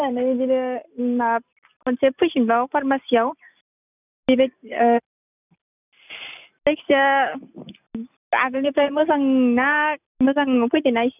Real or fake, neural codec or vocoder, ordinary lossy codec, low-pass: real; none; none; 3.6 kHz